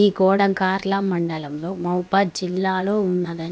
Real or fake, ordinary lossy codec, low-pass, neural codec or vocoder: fake; none; none; codec, 16 kHz, about 1 kbps, DyCAST, with the encoder's durations